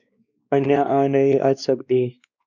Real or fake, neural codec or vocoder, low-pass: fake; codec, 16 kHz, 2 kbps, X-Codec, WavLM features, trained on Multilingual LibriSpeech; 7.2 kHz